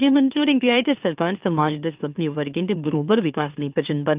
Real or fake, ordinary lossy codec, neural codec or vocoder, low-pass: fake; Opus, 24 kbps; autoencoder, 44.1 kHz, a latent of 192 numbers a frame, MeloTTS; 3.6 kHz